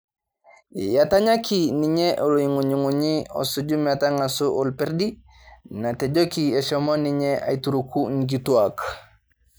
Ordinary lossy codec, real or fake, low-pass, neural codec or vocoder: none; real; none; none